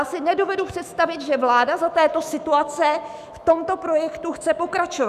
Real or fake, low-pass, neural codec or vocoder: fake; 14.4 kHz; autoencoder, 48 kHz, 128 numbers a frame, DAC-VAE, trained on Japanese speech